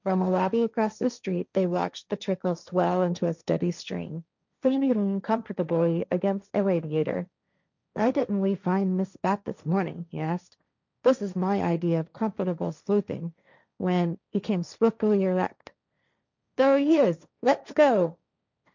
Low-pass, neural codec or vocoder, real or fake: 7.2 kHz; codec, 16 kHz, 1.1 kbps, Voila-Tokenizer; fake